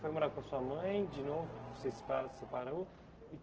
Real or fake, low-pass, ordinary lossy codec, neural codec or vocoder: real; 7.2 kHz; Opus, 16 kbps; none